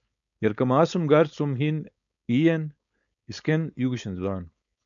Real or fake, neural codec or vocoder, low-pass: fake; codec, 16 kHz, 4.8 kbps, FACodec; 7.2 kHz